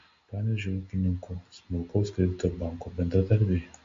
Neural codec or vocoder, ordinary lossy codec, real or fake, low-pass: none; MP3, 48 kbps; real; 7.2 kHz